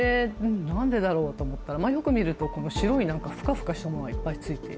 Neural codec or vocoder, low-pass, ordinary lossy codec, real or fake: none; none; none; real